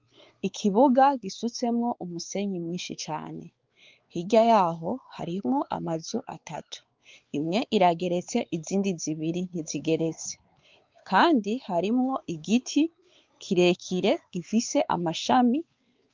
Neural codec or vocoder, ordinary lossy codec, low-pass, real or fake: codec, 16 kHz, 4 kbps, X-Codec, WavLM features, trained on Multilingual LibriSpeech; Opus, 32 kbps; 7.2 kHz; fake